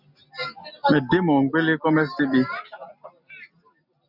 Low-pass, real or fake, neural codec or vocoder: 5.4 kHz; real; none